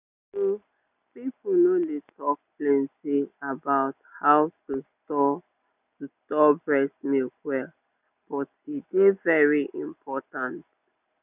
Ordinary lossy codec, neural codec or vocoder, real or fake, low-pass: none; none; real; 3.6 kHz